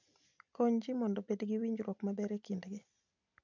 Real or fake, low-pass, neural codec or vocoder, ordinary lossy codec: real; 7.2 kHz; none; MP3, 64 kbps